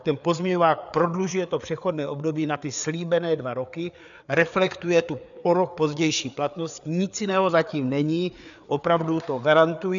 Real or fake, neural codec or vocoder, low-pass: fake; codec, 16 kHz, 4 kbps, FreqCodec, larger model; 7.2 kHz